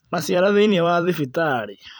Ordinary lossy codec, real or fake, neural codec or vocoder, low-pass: none; fake; vocoder, 44.1 kHz, 128 mel bands every 256 samples, BigVGAN v2; none